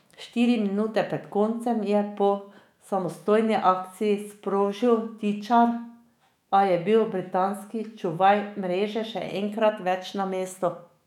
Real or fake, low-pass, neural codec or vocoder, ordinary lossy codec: fake; 19.8 kHz; autoencoder, 48 kHz, 128 numbers a frame, DAC-VAE, trained on Japanese speech; none